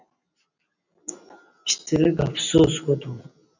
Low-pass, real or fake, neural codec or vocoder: 7.2 kHz; real; none